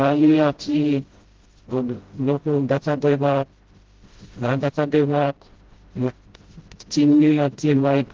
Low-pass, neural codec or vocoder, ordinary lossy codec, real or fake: 7.2 kHz; codec, 16 kHz, 0.5 kbps, FreqCodec, smaller model; Opus, 16 kbps; fake